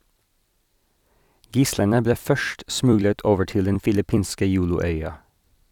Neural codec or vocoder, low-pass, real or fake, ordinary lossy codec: vocoder, 44.1 kHz, 128 mel bands every 256 samples, BigVGAN v2; 19.8 kHz; fake; none